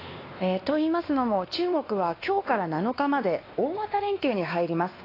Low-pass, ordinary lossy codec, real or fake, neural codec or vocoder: 5.4 kHz; AAC, 24 kbps; fake; codec, 16 kHz, 2 kbps, X-Codec, WavLM features, trained on Multilingual LibriSpeech